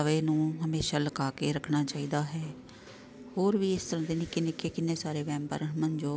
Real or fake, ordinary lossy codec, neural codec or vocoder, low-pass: real; none; none; none